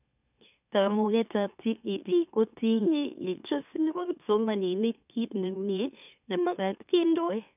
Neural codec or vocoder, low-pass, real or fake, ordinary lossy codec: autoencoder, 44.1 kHz, a latent of 192 numbers a frame, MeloTTS; 3.6 kHz; fake; none